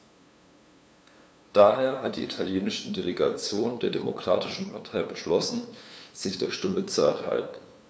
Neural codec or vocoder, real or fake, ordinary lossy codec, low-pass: codec, 16 kHz, 2 kbps, FunCodec, trained on LibriTTS, 25 frames a second; fake; none; none